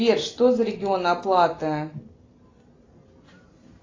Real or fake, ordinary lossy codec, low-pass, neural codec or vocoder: real; AAC, 32 kbps; 7.2 kHz; none